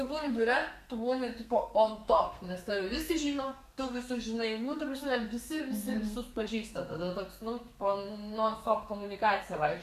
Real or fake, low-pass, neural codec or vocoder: fake; 14.4 kHz; codec, 32 kHz, 1.9 kbps, SNAC